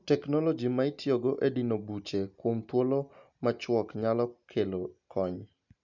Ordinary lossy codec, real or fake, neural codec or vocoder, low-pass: none; real; none; 7.2 kHz